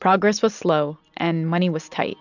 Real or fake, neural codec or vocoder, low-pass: real; none; 7.2 kHz